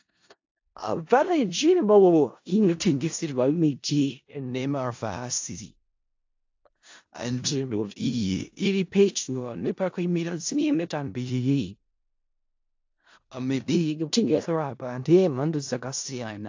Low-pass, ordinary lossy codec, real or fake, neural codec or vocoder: 7.2 kHz; AAC, 48 kbps; fake; codec, 16 kHz in and 24 kHz out, 0.4 kbps, LongCat-Audio-Codec, four codebook decoder